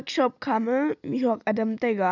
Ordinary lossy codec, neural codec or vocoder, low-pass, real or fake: none; none; 7.2 kHz; real